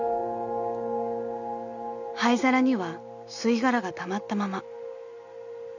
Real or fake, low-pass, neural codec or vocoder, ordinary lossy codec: real; 7.2 kHz; none; none